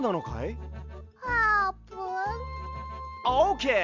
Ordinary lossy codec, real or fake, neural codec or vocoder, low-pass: none; real; none; 7.2 kHz